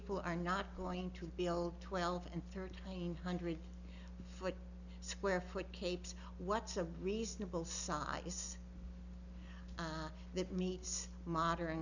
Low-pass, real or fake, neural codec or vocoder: 7.2 kHz; real; none